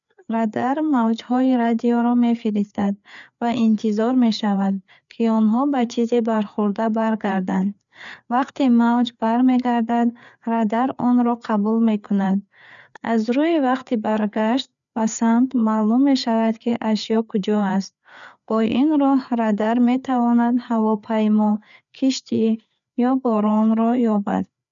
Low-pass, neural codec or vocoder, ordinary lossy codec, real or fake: 7.2 kHz; codec, 16 kHz, 4 kbps, FreqCodec, larger model; none; fake